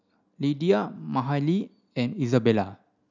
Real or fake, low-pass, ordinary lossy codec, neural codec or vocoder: real; 7.2 kHz; none; none